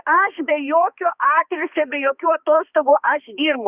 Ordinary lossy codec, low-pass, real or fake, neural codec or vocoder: Opus, 64 kbps; 3.6 kHz; fake; codec, 16 kHz, 2 kbps, X-Codec, HuBERT features, trained on balanced general audio